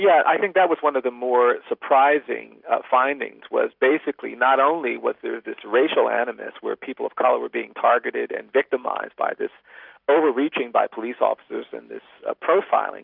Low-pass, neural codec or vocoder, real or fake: 5.4 kHz; none; real